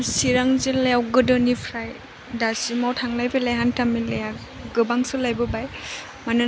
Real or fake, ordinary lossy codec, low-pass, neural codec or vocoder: real; none; none; none